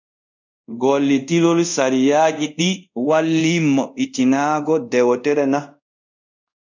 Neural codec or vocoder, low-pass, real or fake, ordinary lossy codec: codec, 24 kHz, 0.5 kbps, DualCodec; 7.2 kHz; fake; MP3, 64 kbps